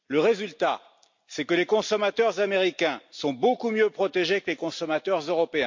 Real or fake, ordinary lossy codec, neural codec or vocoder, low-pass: real; MP3, 48 kbps; none; 7.2 kHz